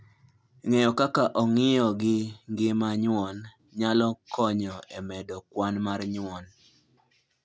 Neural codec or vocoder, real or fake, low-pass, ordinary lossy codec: none; real; none; none